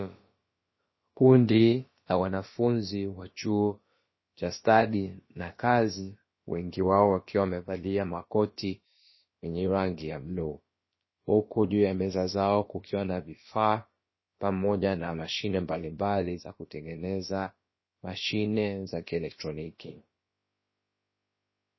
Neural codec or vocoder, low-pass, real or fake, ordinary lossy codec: codec, 16 kHz, about 1 kbps, DyCAST, with the encoder's durations; 7.2 kHz; fake; MP3, 24 kbps